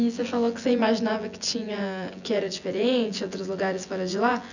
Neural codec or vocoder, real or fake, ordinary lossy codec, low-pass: vocoder, 24 kHz, 100 mel bands, Vocos; fake; none; 7.2 kHz